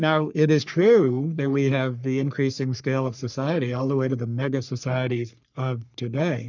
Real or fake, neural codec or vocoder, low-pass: fake; codec, 44.1 kHz, 3.4 kbps, Pupu-Codec; 7.2 kHz